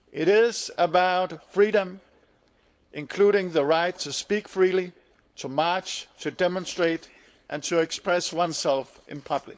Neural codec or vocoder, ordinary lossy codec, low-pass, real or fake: codec, 16 kHz, 4.8 kbps, FACodec; none; none; fake